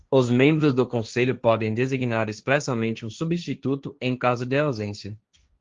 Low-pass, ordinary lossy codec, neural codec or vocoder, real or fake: 7.2 kHz; Opus, 32 kbps; codec, 16 kHz, 1.1 kbps, Voila-Tokenizer; fake